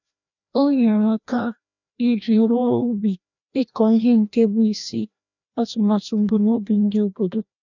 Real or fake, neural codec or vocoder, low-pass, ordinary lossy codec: fake; codec, 16 kHz, 1 kbps, FreqCodec, larger model; 7.2 kHz; none